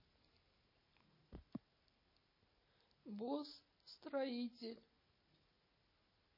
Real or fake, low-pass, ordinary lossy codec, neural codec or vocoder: real; 5.4 kHz; MP3, 24 kbps; none